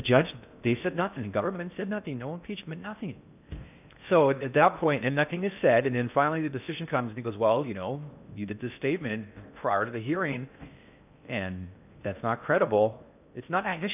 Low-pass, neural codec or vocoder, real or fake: 3.6 kHz; codec, 16 kHz in and 24 kHz out, 0.6 kbps, FocalCodec, streaming, 4096 codes; fake